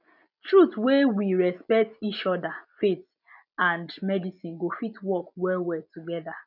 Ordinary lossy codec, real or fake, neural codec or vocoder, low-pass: none; real; none; 5.4 kHz